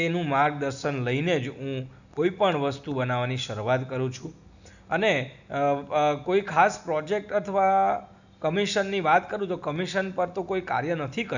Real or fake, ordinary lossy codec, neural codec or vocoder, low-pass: real; none; none; 7.2 kHz